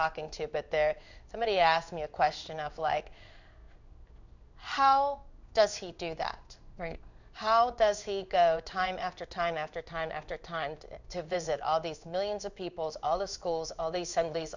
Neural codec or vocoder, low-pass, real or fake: codec, 16 kHz in and 24 kHz out, 1 kbps, XY-Tokenizer; 7.2 kHz; fake